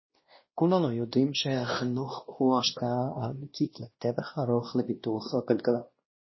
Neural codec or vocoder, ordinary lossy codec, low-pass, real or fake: codec, 16 kHz, 1 kbps, X-Codec, WavLM features, trained on Multilingual LibriSpeech; MP3, 24 kbps; 7.2 kHz; fake